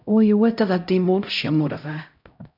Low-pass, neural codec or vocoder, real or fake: 5.4 kHz; codec, 16 kHz, 0.5 kbps, X-Codec, HuBERT features, trained on LibriSpeech; fake